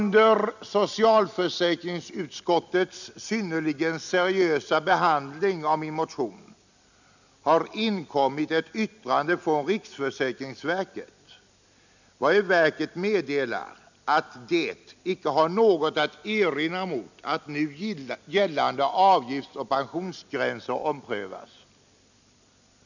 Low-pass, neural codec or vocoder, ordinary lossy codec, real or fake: 7.2 kHz; none; none; real